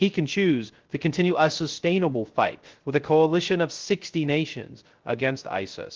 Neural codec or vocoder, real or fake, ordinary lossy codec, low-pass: codec, 16 kHz, 0.3 kbps, FocalCodec; fake; Opus, 16 kbps; 7.2 kHz